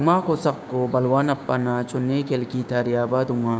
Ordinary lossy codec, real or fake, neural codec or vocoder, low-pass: none; fake; codec, 16 kHz, 6 kbps, DAC; none